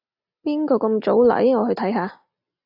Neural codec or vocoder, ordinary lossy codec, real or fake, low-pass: none; AAC, 48 kbps; real; 5.4 kHz